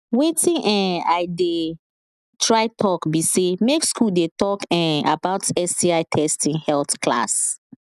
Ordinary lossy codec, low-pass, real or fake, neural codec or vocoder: none; 14.4 kHz; real; none